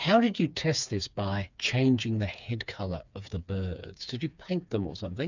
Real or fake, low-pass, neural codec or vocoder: fake; 7.2 kHz; codec, 16 kHz, 4 kbps, FreqCodec, smaller model